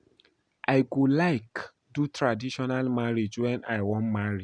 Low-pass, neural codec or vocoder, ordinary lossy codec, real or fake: 9.9 kHz; none; none; real